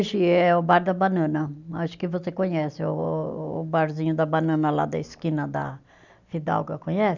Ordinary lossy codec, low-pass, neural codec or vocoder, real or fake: none; 7.2 kHz; none; real